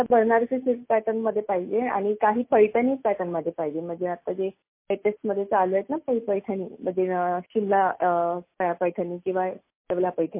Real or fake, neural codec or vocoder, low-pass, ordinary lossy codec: real; none; 3.6 kHz; MP3, 24 kbps